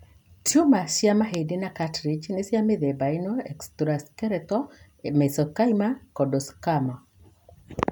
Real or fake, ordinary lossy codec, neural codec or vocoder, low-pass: real; none; none; none